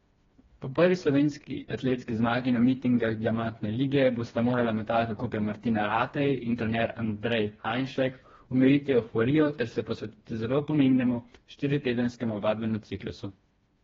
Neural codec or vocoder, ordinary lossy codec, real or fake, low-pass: codec, 16 kHz, 2 kbps, FreqCodec, smaller model; AAC, 24 kbps; fake; 7.2 kHz